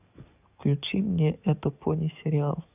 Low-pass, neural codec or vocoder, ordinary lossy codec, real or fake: 3.6 kHz; codec, 44.1 kHz, 7.8 kbps, DAC; none; fake